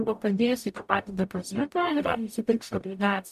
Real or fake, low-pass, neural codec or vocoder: fake; 14.4 kHz; codec, 44.1 kHz, 0.9 kbps, DAC